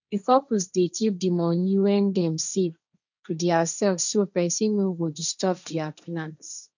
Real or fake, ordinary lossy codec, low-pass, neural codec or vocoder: fake; none; 7.2 kHz; codec, 16 kHz, 1.1 kbps, Voila-Tokenizer